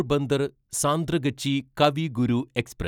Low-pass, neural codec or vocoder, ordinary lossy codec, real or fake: 19.8 kHz; none; none; real